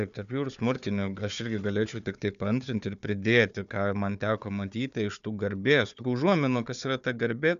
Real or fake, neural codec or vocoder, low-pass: fake; codec, 16 kHz, 4 kbps, FunCodec, trained on Chinese and English, 50 frames a second; 7.2 kHz